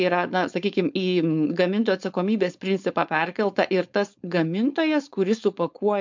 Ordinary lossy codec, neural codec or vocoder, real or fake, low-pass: MP3, 64 kbps; codec, 16 kHz, 4.8 kbps, FACodec; fake; 7.2 kHz